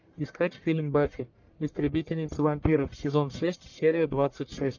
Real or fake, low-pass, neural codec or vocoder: fake; 7.2 kHz; codec, 44.1 kHz, 1.7 kbps, Pupu-Codec